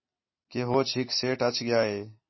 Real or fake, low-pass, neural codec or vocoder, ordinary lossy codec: real; 7.2 kHz; none; MP3, 24 kbps